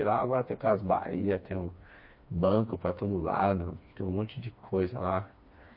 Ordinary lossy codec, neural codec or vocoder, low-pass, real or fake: MP3, 32 kbps; codec, 16 kHz, 2 kbps, FreqCodec, smaller model; 5.4 kHz; fake